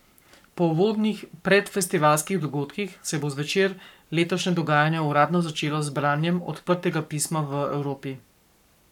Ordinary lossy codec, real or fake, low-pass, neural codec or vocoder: none; fake; 19.8 kHz; codec, 44.1 kHz, 7.8 kbps, Pupu-Codec